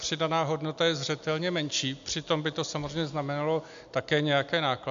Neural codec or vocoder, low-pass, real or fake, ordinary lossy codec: none; 7.2 kHz; real; MP3, 64 kbps